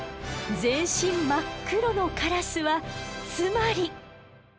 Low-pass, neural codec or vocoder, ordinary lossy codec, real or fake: none; none; none; real